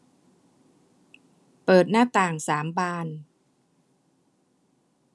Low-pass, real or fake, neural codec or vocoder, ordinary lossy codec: none; real; none; none